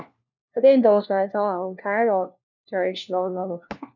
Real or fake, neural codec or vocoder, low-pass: fake; codec, 16 kHz, 1 kbps, FunCodec, trained on LibriTTS, 50 frames a second; 7.2 kHz